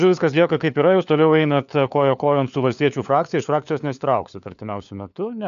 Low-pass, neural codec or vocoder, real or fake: 7.2 kHz; codec, 16 kHz, 4 kbps, FunCodec, trained on LibriTTS, 50 frames a second; fake